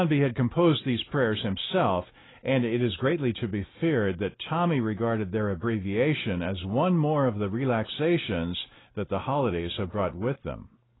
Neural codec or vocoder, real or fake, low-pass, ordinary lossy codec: none; real; 7.2 kHz; AAC, 16 kbps